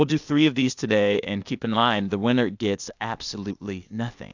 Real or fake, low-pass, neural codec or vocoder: fake; 7.2 kHz; codec, 16 kHz, 0.8 kbps, ZipCodec